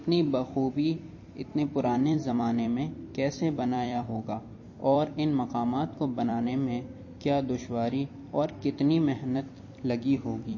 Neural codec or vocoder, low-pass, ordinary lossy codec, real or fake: vocoder, 44.1 kHz, 128 mel bands every 256 samples, BigVGAN v2; 7.2 kHz; MP3, 32 kbps; fake